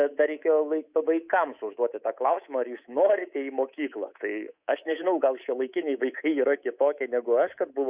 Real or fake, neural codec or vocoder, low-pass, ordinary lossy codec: fake; codec, 24 kHz, 3.1 kbps, DualCodec; 3.6 kHz; Opus, 64 kbps